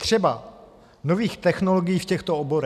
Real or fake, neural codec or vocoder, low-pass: real; none; 14.4 kHz